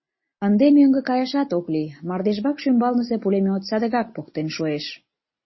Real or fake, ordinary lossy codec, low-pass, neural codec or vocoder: real; MP3, 24 kbps; 7.2 kHz; none